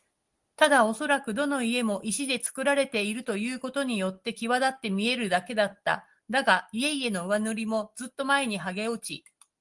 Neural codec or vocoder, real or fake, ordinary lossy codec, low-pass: none; real; Opus, 24 kbps; 10.8 kHz